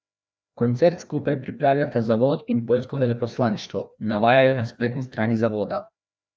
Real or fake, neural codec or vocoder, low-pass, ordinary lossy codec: fake; codec, 16 kHz, 1 kbps, FreqCodec, larger model; none; none